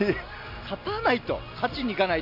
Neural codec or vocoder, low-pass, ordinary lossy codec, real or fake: none; 5.4 kHz; MP3, 48 kbps; real